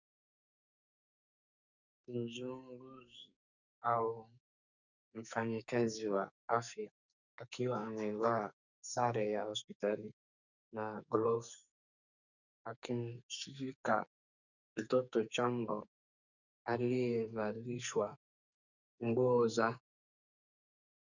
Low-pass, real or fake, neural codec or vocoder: 7.2 kHz; fake; codec, 44.1 kHz, 2.6 kbps, SNAC